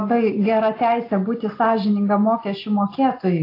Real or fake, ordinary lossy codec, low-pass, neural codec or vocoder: real; AAC, 32 kbps; 5.4 kHz; none